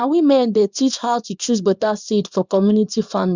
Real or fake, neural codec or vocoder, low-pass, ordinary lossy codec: fake; codec, 24 kHz, 0.9 kbps, WavTokenizer, small release; 7.2 kHz; Opus, 64 kbps